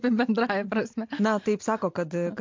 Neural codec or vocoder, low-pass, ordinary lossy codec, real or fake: none; 7.2 kHz; MP3, 48 kbps; real